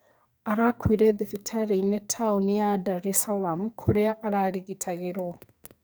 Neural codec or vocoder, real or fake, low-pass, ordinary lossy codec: codec, 44.1 kHz, 2.6 kbps, SNAC; fake; none; none